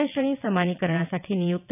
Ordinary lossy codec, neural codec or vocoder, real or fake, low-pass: none; vocoder, 22.05 kHz, 80 mel bands, WaveNeXt; fake; 3.6 kHz